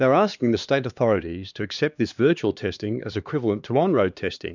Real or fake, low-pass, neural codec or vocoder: fake; 7.2 kHz; codec, 16 kHz, 2 kbps, X-Codec, HuBERT features, trained on LibriSpeech